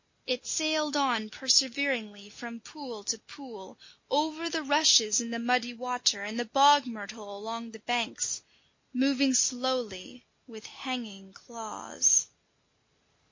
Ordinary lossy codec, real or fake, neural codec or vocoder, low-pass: MP3, 32 kbps; real; none; 7.2 kHz